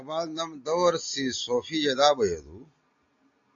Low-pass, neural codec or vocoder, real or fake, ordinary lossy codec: 7.2 kHz; none; real; AAC, 48 kbps